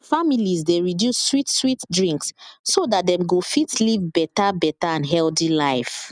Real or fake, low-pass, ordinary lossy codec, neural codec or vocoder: real; 9.9 kHz; none; none